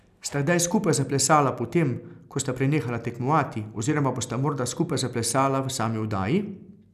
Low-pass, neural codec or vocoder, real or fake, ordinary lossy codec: 14.4 kHz; none; real; none